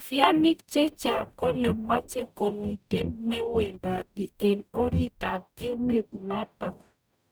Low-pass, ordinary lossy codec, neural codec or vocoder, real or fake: none; none; codec, 44.1 kHz, 0.9 kbps, DAC; fake